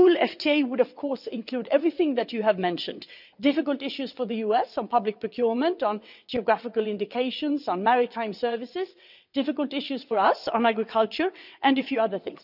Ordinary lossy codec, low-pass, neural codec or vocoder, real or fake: none; 5.4 kHz; vocoder, 22.05 kHz, 80 mel bands, WaveNeXt; fake